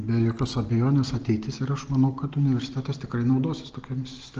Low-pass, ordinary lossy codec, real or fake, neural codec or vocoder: 7.2 kHz; Opus, 16 kbps; real; none